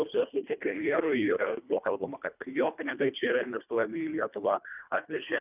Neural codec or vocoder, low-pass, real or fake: codec, 24 kHz, 1.5 kbps, HILCodec; 3.6 kHz; fake